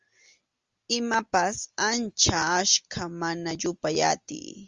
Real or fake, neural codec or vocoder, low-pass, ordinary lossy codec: real; none; 7.2 kHz; Opus, 32 kbps